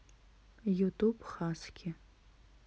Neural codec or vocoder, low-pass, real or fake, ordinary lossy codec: none; none; real; none